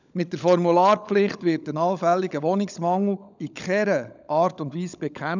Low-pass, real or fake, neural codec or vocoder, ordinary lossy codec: 7.2 kHz; fake; codec, 16 kHz, 16 kbps, FunCodec, trained on LibriTTS, 50 frames a second; none